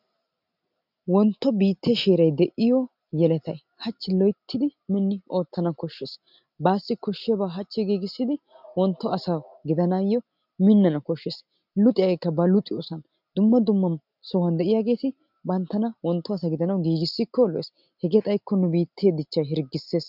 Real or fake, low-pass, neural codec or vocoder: real; 5.4 kHz; none